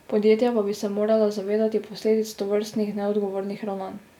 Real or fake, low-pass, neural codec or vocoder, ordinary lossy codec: real; 19.8 kHz; none; none